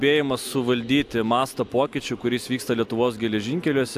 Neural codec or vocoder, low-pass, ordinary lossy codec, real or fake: none; 14.4 kHz; AAC, 96 kbps; real